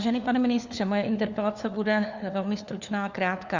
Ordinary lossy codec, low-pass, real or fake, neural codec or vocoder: Opus, 64 kbps; 7.2 kHz; fake; codec, 16 kHz, 4 kbps, FunCodec, trained on LibriTTS, 50 frames a second